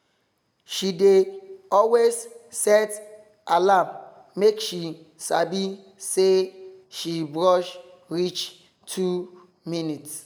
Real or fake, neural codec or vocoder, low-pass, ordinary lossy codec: real; none; none; none